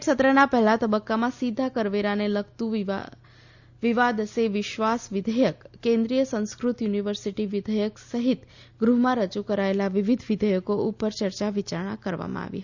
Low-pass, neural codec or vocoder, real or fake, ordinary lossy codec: 7.2 kHz; none; real; Opus, 64 kbps